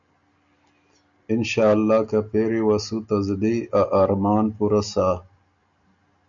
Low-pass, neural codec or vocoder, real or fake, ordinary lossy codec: 7.2 kHz; none; real; MP3, 96 kbps